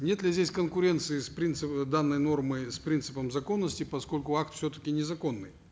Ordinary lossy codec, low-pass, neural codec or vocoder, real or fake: none; none; none; real